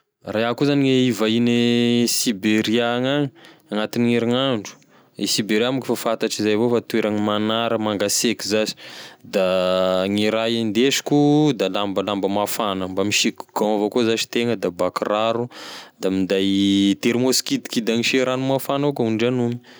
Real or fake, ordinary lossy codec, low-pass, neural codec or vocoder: real; none; none; none